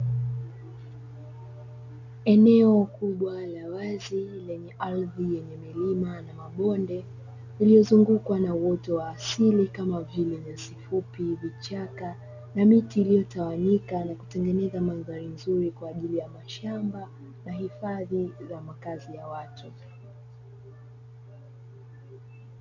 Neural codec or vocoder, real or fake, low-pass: none; real; 7.2 kHz